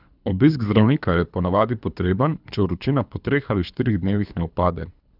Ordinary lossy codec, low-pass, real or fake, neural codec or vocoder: none; 5.4 kHz; fake; codec, 24 kHz, 3 kbps, HILCodec